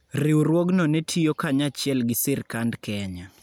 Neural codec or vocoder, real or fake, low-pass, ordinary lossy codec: none; real; none; none